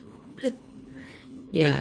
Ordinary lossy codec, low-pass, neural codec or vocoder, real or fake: Opus, 64 kbps; 9.9 kHz; codec, 24 kHz, 1.5 kbps, HILCodec; fake